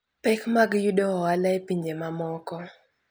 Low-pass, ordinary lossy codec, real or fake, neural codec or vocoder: none; none; real; none